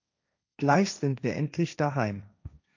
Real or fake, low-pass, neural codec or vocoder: fake; 7.2 kHz; codec, 16 kHz, 1.1 kbps, Voila-Tokenizer